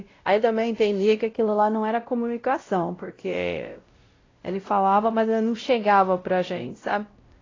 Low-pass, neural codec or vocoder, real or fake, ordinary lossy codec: 7.2 kHz; codec, 16 kHz, 0.5 kbps, X-Codec, WavLM features, trained on Multilingual LibriSpeech; fake; AAC, 32 kbps